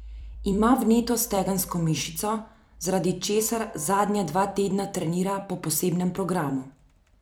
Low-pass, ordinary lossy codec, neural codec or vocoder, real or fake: none; none; vocoder, 44.1 kHz, 128 mel bands every 256 samples, BigVGAN v2; fake